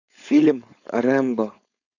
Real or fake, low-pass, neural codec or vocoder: fake; 7.2 kHz; codec, 16 kHz, 4.8 kbps, FACodec